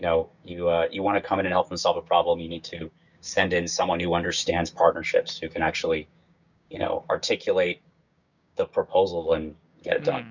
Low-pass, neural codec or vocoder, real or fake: 7.2 kHz; codec, 16 kHz, 6 kbps, DAC; fake